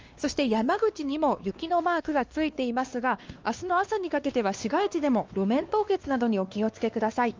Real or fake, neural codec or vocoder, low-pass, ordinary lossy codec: fake; codec, 16 kHz, 2 kbps, X-Codec, WavLM features, trained on Multilingual LibriSpeech; 7.2 kHz; Opus, 24 kbps